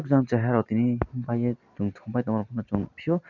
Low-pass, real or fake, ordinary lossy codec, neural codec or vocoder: 7.2 kHz; real; none; none